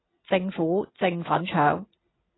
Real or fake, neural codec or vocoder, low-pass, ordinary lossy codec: real; none; 7.2 kHz; AAC, 16 kbps